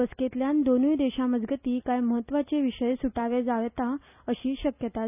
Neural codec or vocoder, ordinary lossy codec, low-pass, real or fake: none; none; 3.6 kHz; real